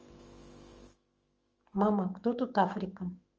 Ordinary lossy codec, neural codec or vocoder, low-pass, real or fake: Opus, 24 kbps; autoencoder, 48 kHz, 128 numbers a frame, DAC-VAE, trained on Japanese speech; 7.2 kHz; fake